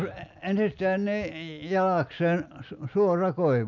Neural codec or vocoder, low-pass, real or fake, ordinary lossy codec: none; 7.2 kHz; real; none